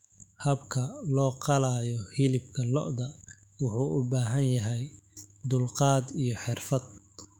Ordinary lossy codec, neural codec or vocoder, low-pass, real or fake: none; autoencoder, 48 kHz, 128 numbers a frame, DAC-VAE, trained on Japanese speech; 19.8 kHz; fake